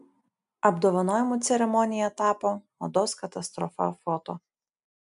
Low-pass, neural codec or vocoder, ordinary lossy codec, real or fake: 10.8 kHz; none; AAC, 96 kbps; real